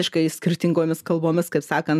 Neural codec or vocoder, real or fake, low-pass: none; real; 14.4 kHz